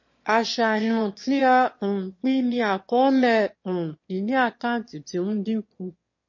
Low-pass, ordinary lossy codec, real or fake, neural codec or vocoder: 7.2 kHz; MP3, 32 kbps; fake; autoencoder, 22.05 kHz, a latent of 192 numbers a frame, VITS, trained on one speaker